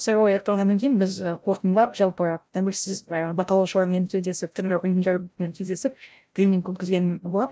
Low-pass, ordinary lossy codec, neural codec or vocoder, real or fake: none; none; codec, 16 kHz, 0.5 kbps, FreqCodec, larger model; fake